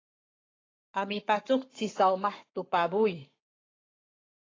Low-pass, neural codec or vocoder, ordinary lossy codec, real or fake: 7.2 kHz; codec, 44.1 kHz, 7.8 kbps, Pupu-Codec; AAC, 32 kbps; fake